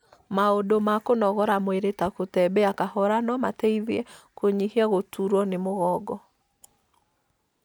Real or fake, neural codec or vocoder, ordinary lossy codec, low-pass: real; none; none; none